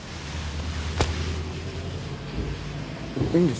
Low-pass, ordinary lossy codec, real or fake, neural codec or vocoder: none; none; real; none